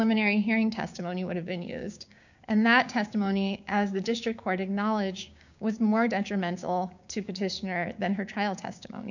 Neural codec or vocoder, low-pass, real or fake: codec, 16 kHz, 6 kbps, DAC; 7.2 kHz; fake